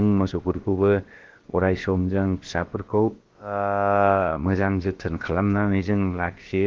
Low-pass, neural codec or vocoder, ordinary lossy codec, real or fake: 7.2 kHz; codec, 16 kHz, about 1 kbps, DyCAST, with the encoder's durations; Opus, 16 kbps; fake